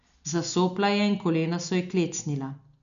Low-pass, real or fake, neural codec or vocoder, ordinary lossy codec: 7.2 kHz; real; none; none